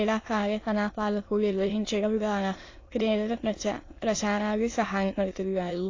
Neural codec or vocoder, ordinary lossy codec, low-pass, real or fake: autoencoder, 22.05 kHz, a latent of 192 numbers a frame, VITS, trained on many speakers; AAC, 32 kbps; 7.2 kHz; fake